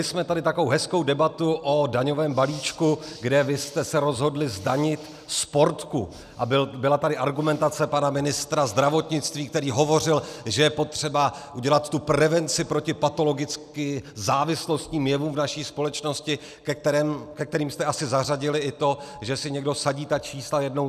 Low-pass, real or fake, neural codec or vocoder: 14.4 kHz; real; none